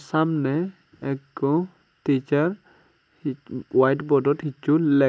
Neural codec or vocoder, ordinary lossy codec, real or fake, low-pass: none; none; real; none